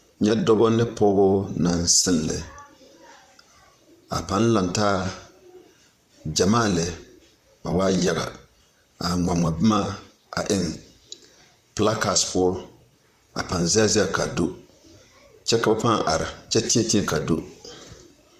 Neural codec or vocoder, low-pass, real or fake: vocoder, 44.1 kHz, 128 mel bands, Pupu-Vocoder; 14.4 kHz; fake